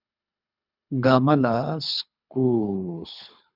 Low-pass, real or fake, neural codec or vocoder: 5.4 kHz; fake; codec, 24 kHz, 3 kbps, HILCodec